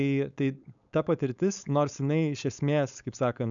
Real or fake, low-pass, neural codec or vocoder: fake; 7.2 kHz; codec, 16 kHz, 4.8 kbps, FACodec